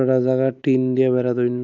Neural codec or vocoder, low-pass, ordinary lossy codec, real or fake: none; 7.2 kHz; none; real